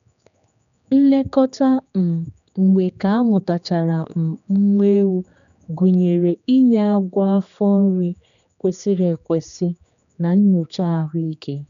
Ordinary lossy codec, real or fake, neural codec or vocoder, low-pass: MP3, 96 kbps; fake; codec, 16 kHz, 2 kbps, X-Codec, HuBERT features, trained on general audio; 7.2 kHz